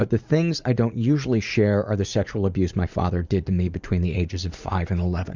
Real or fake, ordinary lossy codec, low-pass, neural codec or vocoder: fake; Opus, 64 kbps; 7.2 kHz; vocoder, 44.1 kHz, 80 mel bands, Vocos